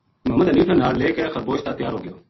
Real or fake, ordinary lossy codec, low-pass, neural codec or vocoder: real; MP3, 24 kbps; 7.2 kHz; none